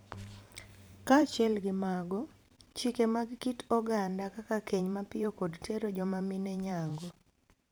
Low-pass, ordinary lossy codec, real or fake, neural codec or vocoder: none; none; fake; vocoder, 44.1 kHz, 128 mel bands every 512 samples, BigVGAN v2